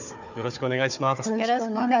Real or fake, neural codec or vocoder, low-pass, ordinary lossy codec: fake; codec, 16 kHz, 4 kbps, FunCodec, trained on Chinese and English, 50 frames a second; 7.2 kHz; none